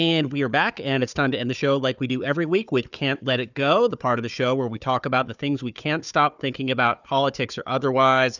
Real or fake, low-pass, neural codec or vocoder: fake; 7.2 kHz; codec, 16 kHz, 4 kbps, FunCodec, trained on Chinese and English, 50 frames a second